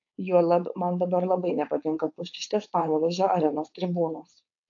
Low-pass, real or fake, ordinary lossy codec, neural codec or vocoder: 7.2 kHz; fake; AAC, 48 kbps; codec, 16 kHz, 4.8 kbps, FACodec